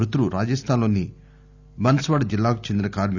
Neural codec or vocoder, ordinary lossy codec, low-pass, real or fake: none; none; 7.2 kHz; real